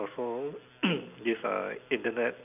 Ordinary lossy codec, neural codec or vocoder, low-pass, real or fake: none; none; 3.6 kHz; real